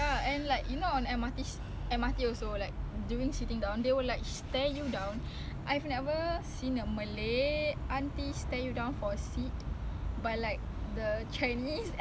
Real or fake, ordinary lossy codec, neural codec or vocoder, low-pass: real; none; none; none